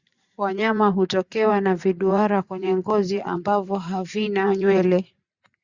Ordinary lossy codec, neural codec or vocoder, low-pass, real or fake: Opus, 64 kbps; vocoder, 44.1 kHz, 128 mel bands every 512 samples, BigVGAN v2; 7.2 kHz; fake